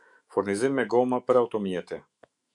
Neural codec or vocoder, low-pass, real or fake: autoencoder, 48 kHz, 128 numbers a frame, DAC-VAE, trained on Japanese speech; 10.8 kHz; fake